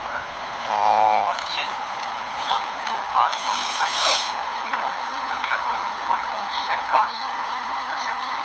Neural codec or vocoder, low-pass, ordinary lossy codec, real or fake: codec, 16 kHz, 4 kbps, FunCodec, trained on LibriTTS, 50 frames a second; none; none; fake